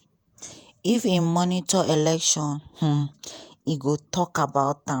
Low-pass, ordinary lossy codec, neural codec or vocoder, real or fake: none; none; vocoder, 48 kHz, 128 mel bands, Vocos; fake